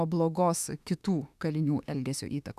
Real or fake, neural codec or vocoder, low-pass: fake; autoencoder, 48 kHz, 32 numbers a frame, DAC-VAE, trained on Japanese speech; 14.4 kHz